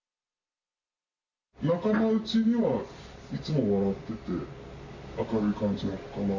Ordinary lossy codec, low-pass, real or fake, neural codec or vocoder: none; 7.2 kHz; real; none